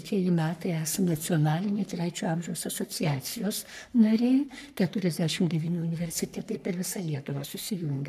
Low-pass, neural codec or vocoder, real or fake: 14.4 kHz; codec, 44.1 kHz, 3.4 kbps, Pupu-Codec; fake